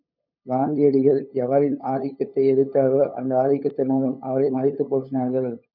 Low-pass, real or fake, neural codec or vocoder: 5.4 kHz; fake; codec, 16 kHz, 8 kbps, FunCodec, trained on LibriTTS, 25 frames a second